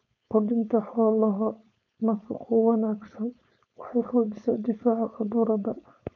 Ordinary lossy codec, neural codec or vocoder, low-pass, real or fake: none; codec, 16 kHz, 4.8 kbps, FACodec; 7.2 kHz; fake